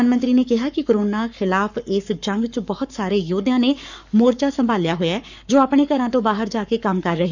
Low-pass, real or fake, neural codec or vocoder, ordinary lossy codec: 7.2 kHz; fake; codec, 44.1 kHz, 7.8 kbps, Pupu-Codec; none